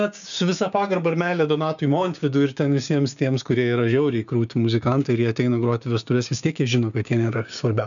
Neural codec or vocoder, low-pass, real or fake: codec, 16 kHz, 6 kbps, DAC; 7.2 kHz; fake